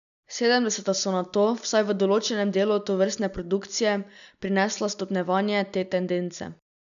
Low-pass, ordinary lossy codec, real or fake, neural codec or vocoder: 7.2 kHz; none; real; none